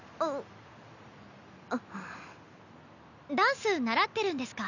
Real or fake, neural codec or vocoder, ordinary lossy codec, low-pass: real; none; none; 7.2 kHz